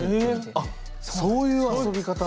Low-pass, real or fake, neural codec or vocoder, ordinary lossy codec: none; real; none; none